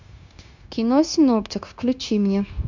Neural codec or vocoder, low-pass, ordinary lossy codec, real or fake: codec, 16 kHz, 0.9 kbps, LongCat-Audio-Codec; 7.2 kHz; MP3, 64 kbps; fake